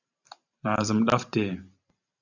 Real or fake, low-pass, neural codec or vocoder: fake; 7.2 kHz; vocoder, 44.1 kHz, 128 mel bands every 512 samples, BigVGAN v2